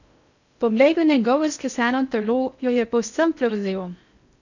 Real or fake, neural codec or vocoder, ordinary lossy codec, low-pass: fake; codec, 16 kHz in and 24 kHz out, 0.6 kbps, FocalCodec, streaming, 4096 codes; none; 7.2 kHz